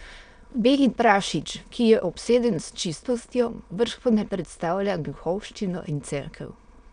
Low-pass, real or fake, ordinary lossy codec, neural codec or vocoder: 9.9 kHz; fake; none; autoencoder, 22.05 kHz, a latent of 192 numbers a frame, VITS, trained on many speakers